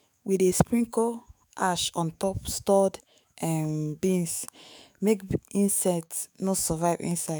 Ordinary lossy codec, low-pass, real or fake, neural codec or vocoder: none; none; fake; autoencoder, 48 kHz, 128 numbers a frame, DAC-VAE, trained on Japanese speech